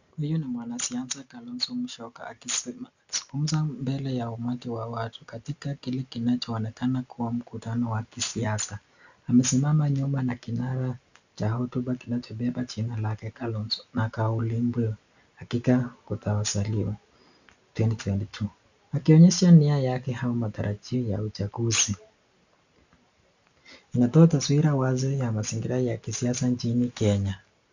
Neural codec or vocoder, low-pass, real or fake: none; 7.2 kHz; real